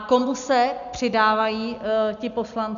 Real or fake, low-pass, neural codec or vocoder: real; 7.2 kHz; none